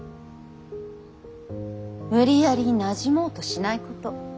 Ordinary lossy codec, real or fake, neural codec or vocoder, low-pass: none; real; none; none